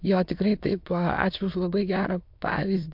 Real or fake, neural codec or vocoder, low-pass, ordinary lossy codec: fake; autoencoder, 22.05 kHz, a latent of 192 numbers a frame, VITS, trained on many speakers; 5.4 kHz; MP3, 48 kbps